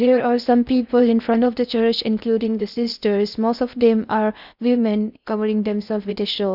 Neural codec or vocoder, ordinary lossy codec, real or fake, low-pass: codec, 16 kHz in and 24 kHz out, 0.6 kbps, FocalCodec, streaming, 4096 codes; none; fake; 5.4 kHz